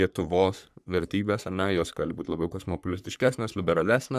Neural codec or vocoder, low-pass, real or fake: codec, 44.1 kHz, 3.4 kbps, Pupu-Codec; 14.4 kHz; fake